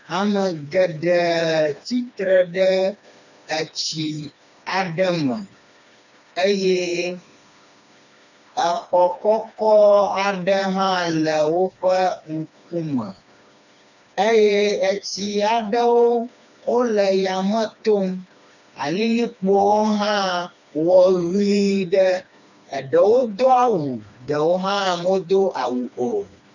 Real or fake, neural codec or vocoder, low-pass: fake; codec, 16 kHz, 2 kbps, FreqCodec, smaller model; 7.2 kHz